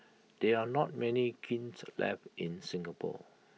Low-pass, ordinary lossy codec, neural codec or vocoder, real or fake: none; none; none; real